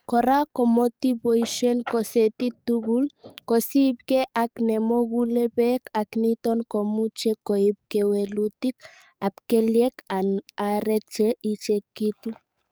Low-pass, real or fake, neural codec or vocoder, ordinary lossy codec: none; fake; codec, 44.1 kHz, 7.8 kbps, DAC; none